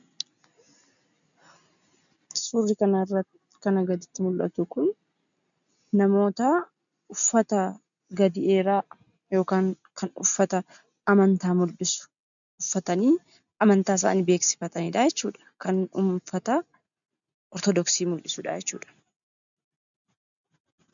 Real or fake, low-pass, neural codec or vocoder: real; 7.2 kHz; none